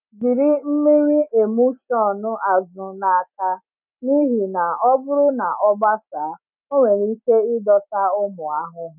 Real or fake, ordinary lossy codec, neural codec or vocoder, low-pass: real; none; none; 3.6 kHz